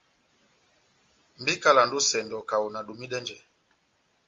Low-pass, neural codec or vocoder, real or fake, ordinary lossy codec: 7.2 kHz; none; real; Opus, 32 kbps